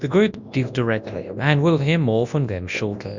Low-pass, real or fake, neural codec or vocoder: 7.2 kHz; fake; codec, 24 kHz, 0.9 kbps, WavTokenizer, large speech release